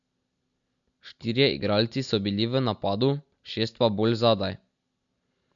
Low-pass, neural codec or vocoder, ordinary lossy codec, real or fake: 7.2 kHz; none; MP3, 64 kbps; real